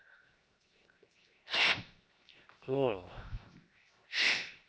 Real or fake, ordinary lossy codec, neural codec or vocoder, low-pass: fake; none; codec, 16 kHz, 0.7 kbps, FocalCodec; none